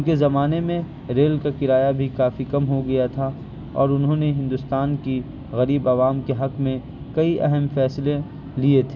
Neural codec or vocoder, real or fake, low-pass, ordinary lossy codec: none; real; 7.2 kHz; none